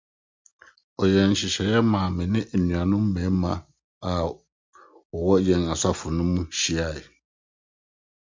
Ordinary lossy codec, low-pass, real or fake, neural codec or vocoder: MP3, 64 kbps; 7.2 kHz; real; none